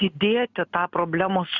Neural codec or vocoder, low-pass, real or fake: none; 7.2 kHz; real